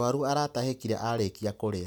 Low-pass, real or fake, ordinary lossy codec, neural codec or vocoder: none; real; none; none